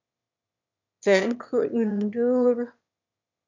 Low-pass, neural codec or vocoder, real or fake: 7.2 kHz; autoencoder, 22.05 kHz, a latent of 192 numbers a frame, VITS, trained on one speaker; fake